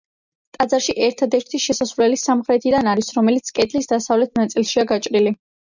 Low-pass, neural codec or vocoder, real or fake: 7.2 kHz; none; real